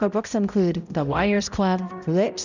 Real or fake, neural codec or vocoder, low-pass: fake; codec, 16 kHz, 0.5 kbps, X-Codec, HuBERT features, trained on balanced general audio; 7.2 kHz